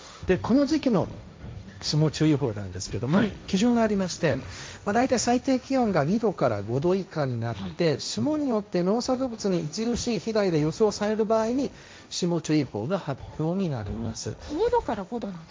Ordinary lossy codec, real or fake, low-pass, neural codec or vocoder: none; fake; none; codec, 16 kHz, 1.1 kbps, Voila-Tokenizer